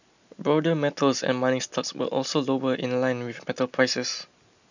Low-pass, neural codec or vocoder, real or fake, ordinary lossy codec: 7.2 kHz; none; real; none